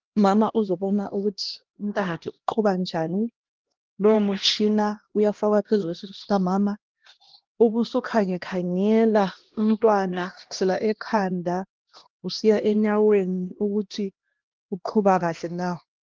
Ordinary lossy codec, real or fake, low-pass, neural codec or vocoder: Opus, 16 kbps; fake; 7.2 kHz; codec, 16 kHz, 1 kbps, X-Codec, HuBERT features, trained on LibriSpeech